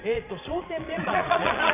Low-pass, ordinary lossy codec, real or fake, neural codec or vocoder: 3.6 kHz; none; fake; vocoder, 22.05 kHz, 80 mel bands, Vocos